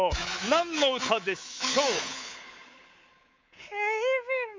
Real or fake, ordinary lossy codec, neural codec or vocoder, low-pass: fake; MP3, 48 kbps; codec, 24 kHz, 3.1 kbps, DualCodec; 7.2 kHz